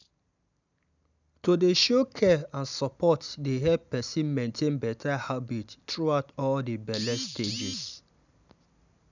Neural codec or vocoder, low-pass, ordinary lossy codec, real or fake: none; 7.2 kHz; none; real